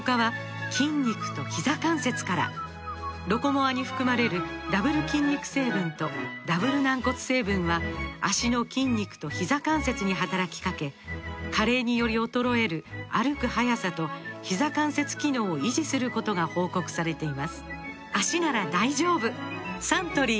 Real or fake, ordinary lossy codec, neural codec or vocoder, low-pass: real; none; none; none